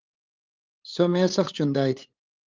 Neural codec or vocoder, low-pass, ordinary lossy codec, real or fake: codec, 16 kHz, 8 kbps, FunCodec, trained on LibriTTS, 25 frames a second; 7.2 kHz; Opus, 32 kbps; fake